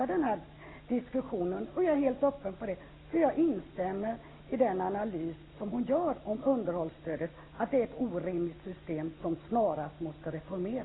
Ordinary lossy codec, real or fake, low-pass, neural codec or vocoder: AAC, 16 kbps; real; 7.2 kHz; none